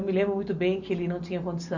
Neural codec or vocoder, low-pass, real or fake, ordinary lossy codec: none; 7.2 kHz; real; none